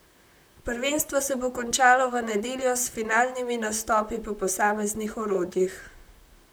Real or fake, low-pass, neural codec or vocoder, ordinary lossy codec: fake; none; vocoder, 44.1 kHz, 128 mel bands, Pupu-Vocoder; none